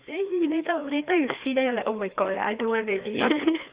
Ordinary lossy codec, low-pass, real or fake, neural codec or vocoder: Opus, 64 kbps; 3.6 kHz; fake; codec, 16 kHz, 2 kbps, FreqCodec, larger model